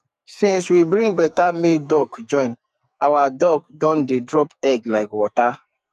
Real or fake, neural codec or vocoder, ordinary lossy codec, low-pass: fake; codec, 44.1 kHz, 2.6 kbps, SNAC; MP3, 96 kbps; 14.4 kHz